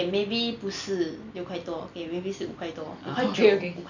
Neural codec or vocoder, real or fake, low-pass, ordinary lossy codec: none; real; 7.2 kHz; none